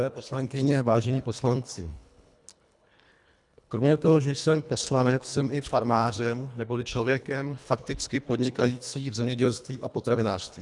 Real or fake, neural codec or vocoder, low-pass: fake; codec, 24 kHz, 1.5 kbps, HILCodec; 10.8 kHz